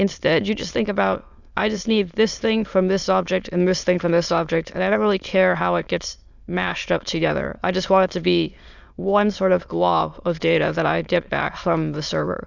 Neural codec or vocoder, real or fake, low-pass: autoencoder, 22.05 kHz, a latent of 192 numbers a frame, VITS, trained on many speakers; fake; 7.2 kHz